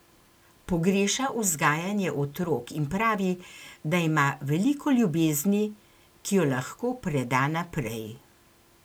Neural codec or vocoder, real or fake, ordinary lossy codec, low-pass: none; real; none; none